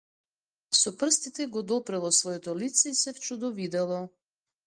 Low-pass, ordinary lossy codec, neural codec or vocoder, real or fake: 9.9 kHz; Opus, 32 kbps; none; real